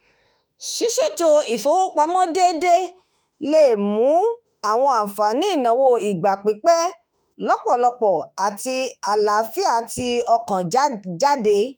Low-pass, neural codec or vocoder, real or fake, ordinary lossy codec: none; autoencoder, 48 kHz, 32 numbers a frame, DAC-VAE, trained on Japanese speech; fake; none